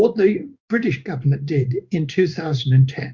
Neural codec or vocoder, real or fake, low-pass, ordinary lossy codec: codec, 16 kHz in and 24 kHz out, 1 kbps, XY-Tokenizer; fake; 7.2 kHz; Opus, 64 kbps